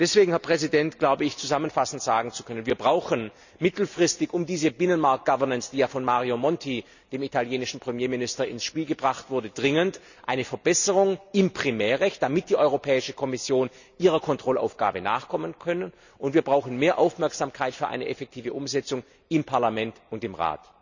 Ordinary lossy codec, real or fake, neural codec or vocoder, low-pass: none; real; none; 7.2 kHz